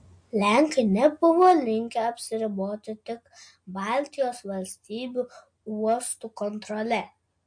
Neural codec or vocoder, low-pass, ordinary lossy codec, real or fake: none; 9.9 kHz; MP3, 48 kbps; real